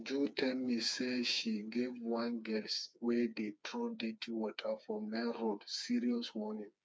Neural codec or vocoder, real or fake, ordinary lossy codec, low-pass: codec, 16 kHz, 4 kbps, FreqCodec, smaller model; fake; none; none